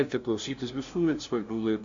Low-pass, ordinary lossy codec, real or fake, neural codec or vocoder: 7.2 kHz; Opus, 64 kbps; fake; codec, 16 kHz, 0.5 kbps, FunCodec, trained on LibriTTS, 25 frames a second